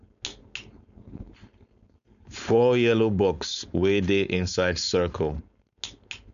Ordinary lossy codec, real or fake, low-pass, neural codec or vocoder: none; fake; 7.2 kHz; codec, 16 kHz, 4.8 kbps, FACodec